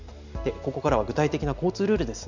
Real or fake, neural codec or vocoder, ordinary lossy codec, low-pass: real; none; none; 7.2 kHz